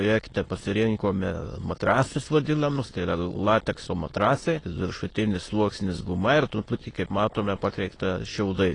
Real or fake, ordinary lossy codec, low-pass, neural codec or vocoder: fake; AAC, 32 kbps; 9.9 kHz; autoencoder, 22.05 kHz, a latent of 192 numbers a frame, VITS, trained on many speakers